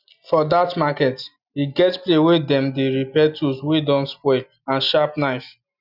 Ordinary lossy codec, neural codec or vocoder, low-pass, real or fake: none; none; 5.4 kHz; real